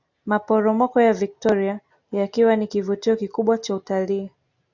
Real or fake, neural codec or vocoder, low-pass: real; none; 7.2 kHz